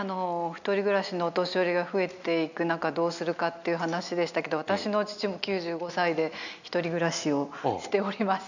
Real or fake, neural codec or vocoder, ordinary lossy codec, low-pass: real; none; none; 7.2 kHz